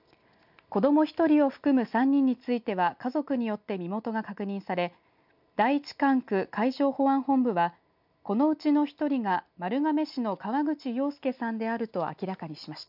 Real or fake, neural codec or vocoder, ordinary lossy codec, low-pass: real; none; none; 5.4 kHz